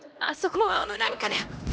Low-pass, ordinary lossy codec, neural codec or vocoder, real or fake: none; none; codec, 16 kHz, 1 kbps, X-Codec, HuBERT features, trained on LibriSpeech; fake